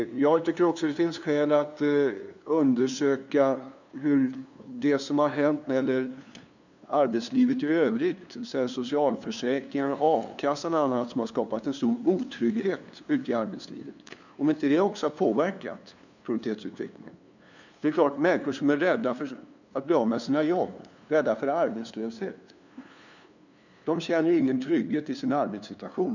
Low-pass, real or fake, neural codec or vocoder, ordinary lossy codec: 7.2 kHz; fake; codec, 16 kHz, 2 kbps, FunCodec, trained on LibriTTS, 25 frames a second; none